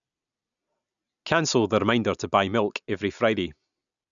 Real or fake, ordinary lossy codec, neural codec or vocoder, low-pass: real; none; none; 7.2 kHz